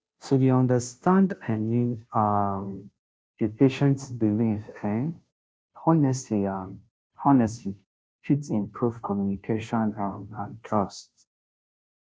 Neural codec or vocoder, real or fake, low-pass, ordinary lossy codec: codec, 16 kHz, 0.5 kbps, FunCodec, trained on Chinese and English, 25 frames a second; fake; none; none